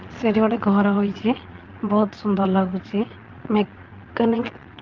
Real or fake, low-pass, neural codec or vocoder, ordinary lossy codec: fake; 7.2 kHz; vocoder, 22.05 kHz, 80 mel bands, Vocos; Opus, 32 kbps